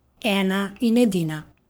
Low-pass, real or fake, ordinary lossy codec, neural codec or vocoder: none; fake; none; codec, 44.1 kHz, 3.4 kbps, Pupu-Codec